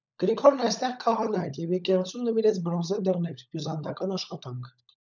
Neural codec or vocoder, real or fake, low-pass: codec, 16 kHz, 16 kbps, FunCodec, trained on LibriTTS, 50 frames a second; fake; 7.2 kHz